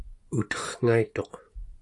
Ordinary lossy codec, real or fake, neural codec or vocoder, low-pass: AAC, 48 kbps; fake; vocoder, 24 kHz, 100 mel bands, Vocos; 10.8 kHz